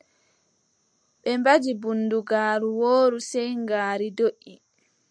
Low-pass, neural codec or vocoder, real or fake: 9.9 kHz; none; real